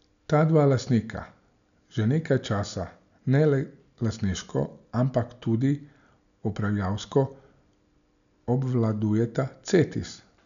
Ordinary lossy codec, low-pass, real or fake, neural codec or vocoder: none; 7.2 kHz; real; none